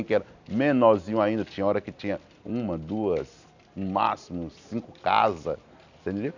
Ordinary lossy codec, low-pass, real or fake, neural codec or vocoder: none; 7.2 kHz; real; none